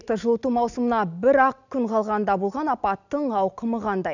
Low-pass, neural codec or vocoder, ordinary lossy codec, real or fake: 7.2 kHz; none; none; real